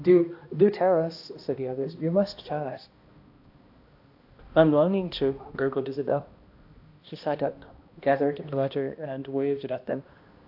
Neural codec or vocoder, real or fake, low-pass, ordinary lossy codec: codec, 16 kHz, 1 kbps, X-Codec, HuBERT features, trained on balanced general audio; fake; 5.4 kHz; AAC, 48 kbps